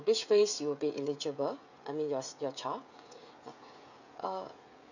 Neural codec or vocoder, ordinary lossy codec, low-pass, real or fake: codec, 16 kHz, 16 kbps, FreqCodec, smaller model; none; 7.2 kHz; fake